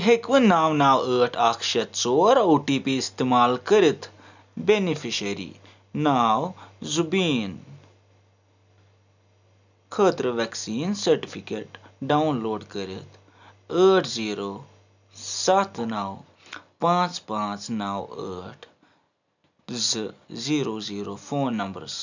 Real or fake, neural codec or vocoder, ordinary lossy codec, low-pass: real; none; none; 7.2 kHz